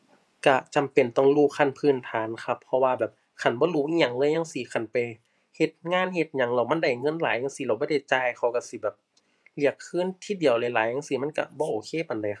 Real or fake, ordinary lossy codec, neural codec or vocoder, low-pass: fake; none; vocoder, 24 kHz, 100 mel bands, Vocos; none